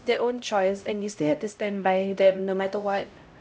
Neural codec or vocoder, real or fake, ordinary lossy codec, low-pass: codec, 16 kHz, 0.5 kbps, X-Codec, HuBERT features, trained on LibriSpeech; fake; none; none